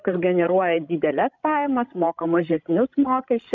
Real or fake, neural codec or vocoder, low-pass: fake; codec, 16 kHz, 16 kbps, FreqCodec, larger model; 7.2 kHz